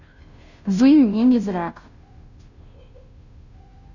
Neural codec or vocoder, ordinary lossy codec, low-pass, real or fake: codec, 16 kHz, 0.5 kbps, FunCodec, trained on Chinese and English, 25 frames a second; none; 7.2 kHz; fake